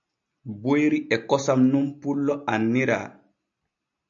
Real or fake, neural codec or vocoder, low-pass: real; none; 7.2 kHz